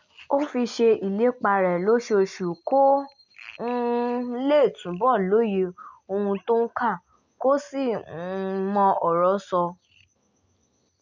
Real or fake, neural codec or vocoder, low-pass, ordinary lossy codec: real; none; 7.2 kHz; none